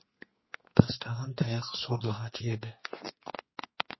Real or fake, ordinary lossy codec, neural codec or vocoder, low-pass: fake; MP3, 24 kbps; codec, 32 kHz, 1.9 kbps, SNAC; 7.2 kHz